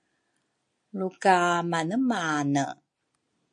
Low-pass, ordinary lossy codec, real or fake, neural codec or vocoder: 9.9 kHz; MP3, 48 kbps; real; none